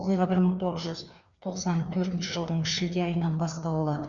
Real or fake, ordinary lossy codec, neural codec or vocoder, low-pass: fake; Opus, 64 kbps; codec, 16 kHz, 2 kbps, FreqCodec, larger model; 7.2 kHz